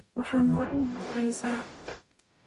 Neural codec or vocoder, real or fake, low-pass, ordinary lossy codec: codec, 44.1 kHz, 0.9 kbps, DAC; fake; 14.4 kHz; MP3, 48 kbps